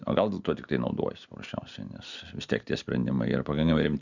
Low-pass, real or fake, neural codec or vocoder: 7.2 kHz; real; none